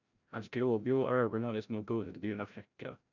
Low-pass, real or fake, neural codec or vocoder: 7.2 kHz; fake; codec, 16 kHz, 0.5 kbps, FreqCodec, larger model